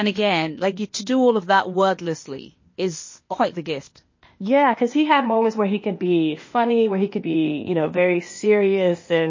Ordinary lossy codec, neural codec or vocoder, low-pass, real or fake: MP3, 32 kbps; codec, 16 kHz, 0.8 kbps, ZipCodec; 7.2 kHz; fake